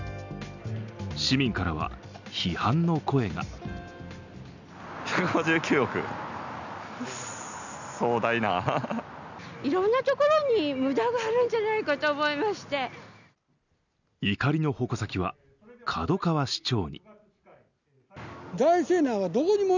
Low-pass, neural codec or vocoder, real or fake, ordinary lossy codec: 7.2 kHz; none; real; none